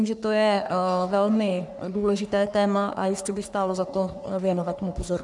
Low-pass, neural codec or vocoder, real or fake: 10.8 kHz; codec, 44.1 kHz, 3.4 kbps, Pupu-Codec; fake